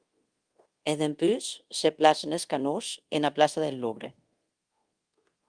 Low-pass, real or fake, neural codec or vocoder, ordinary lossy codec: 9.9 kHz; fake; codec, 24 kHz, 0.5 kbps, DualCodec; Opus, 24 kbps